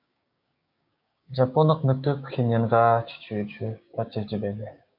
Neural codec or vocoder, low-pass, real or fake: codec, 16 kHz, 6 kbps, DAC; 5.4 kHz; fake